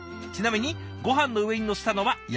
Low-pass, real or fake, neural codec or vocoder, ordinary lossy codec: none; real; none; none